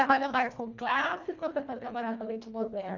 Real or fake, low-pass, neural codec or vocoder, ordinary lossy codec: fake; 7.2 kHz; codec, 24 kHz, 1.5 kbps, HILCodec; none